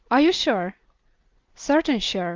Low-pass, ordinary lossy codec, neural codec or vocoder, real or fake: 7.2 kHz; Opus, 32 kbps; none; real